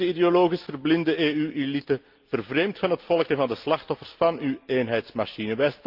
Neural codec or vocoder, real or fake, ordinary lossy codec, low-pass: none; real; Opus, 24 kbps; 5.4 kHz